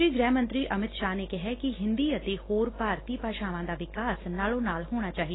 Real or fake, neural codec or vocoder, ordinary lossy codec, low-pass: real; none; AAC, 16 kbps; 7.2 kHz